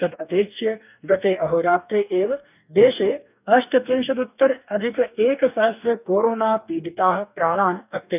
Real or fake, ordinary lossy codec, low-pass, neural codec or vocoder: fake; none; 3.6 kHz; codec, 44.1 kHz, 2.6 kbps, DAC